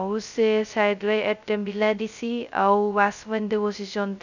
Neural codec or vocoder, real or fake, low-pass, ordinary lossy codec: codec, 16 kHz, 0.2 kbps, FocalCodec; fake; 7.2 kHz; none